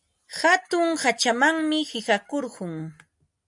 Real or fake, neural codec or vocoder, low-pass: real; none; 10.8 kHz